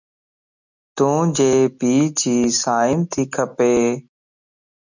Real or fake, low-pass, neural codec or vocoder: real; 7.2 kHz; none